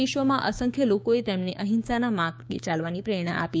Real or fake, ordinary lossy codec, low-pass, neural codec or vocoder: fake; none; none; codec, 16 kHz, 6 kbps, DAC